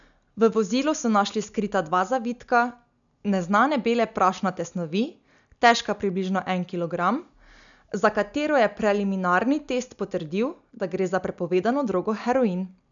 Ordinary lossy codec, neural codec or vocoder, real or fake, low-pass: none; none; real; 7.2 kHz